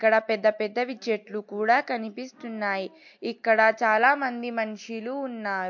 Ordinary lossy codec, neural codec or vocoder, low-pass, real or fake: MP3, 48 kbps; none; 7.2 kHz; real